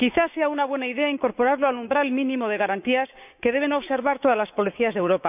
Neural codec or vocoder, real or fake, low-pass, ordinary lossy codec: codec, 16 kHz, 6 kbps, DAC; fake; 3.6 kHz; none